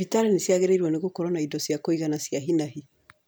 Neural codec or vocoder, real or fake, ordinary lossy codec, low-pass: none; real; none; none